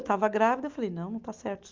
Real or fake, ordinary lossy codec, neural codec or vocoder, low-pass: real; Opus, 32 kbps; none; 7.2 kHz